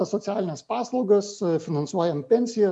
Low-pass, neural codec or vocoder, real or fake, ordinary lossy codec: 9.9 kHz; none; real; MP3, 64 kbps